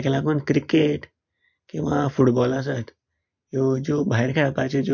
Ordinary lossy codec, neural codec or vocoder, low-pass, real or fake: MP3, 48 kbps; none; 7.2 kHz; real